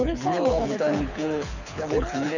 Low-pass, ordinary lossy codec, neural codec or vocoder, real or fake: 7.2 kHz; none; codec, 24 kHz, 6 kbps, HILCodec; fake